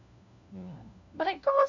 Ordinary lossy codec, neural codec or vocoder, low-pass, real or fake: none; codec, 16 kHz, 1 kbps, FunCodec, trained on LibriTTS, 50 frames a second; 7.2 kHz; fake